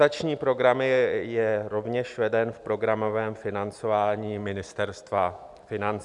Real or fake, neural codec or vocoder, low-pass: real; none; 10.8 kHz